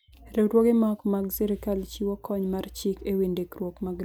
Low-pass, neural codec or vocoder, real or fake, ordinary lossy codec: none; none; real; none